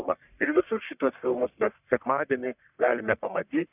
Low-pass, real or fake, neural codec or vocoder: 3.6 kHz; fake; codec, 44.1 kHz, 1.7 kbps, Pupu-Codec